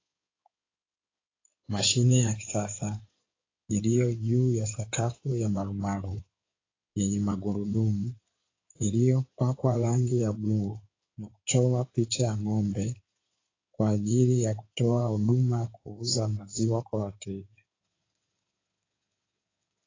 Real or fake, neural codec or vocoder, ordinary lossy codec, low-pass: fake; codec, 16 kHz in and 24 kHz out, 2.2 kbps, FireRedTTS-2 codec; AAC, 32 kbps; 7.2 kHz